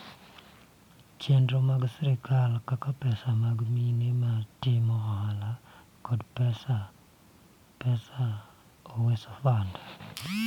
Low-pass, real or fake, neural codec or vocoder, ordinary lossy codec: 19.8 kHz; real; none; MP3, 96 kbps